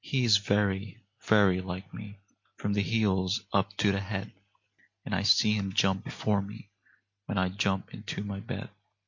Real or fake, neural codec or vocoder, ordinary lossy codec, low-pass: real; none; MP3, 48 kbps; 7.2 kHz